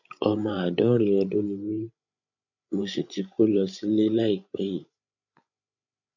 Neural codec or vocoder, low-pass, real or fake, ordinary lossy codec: codec, 16 kHz, 8 kbps, FreqCodec, larger model; 7.2 kHz; fake; none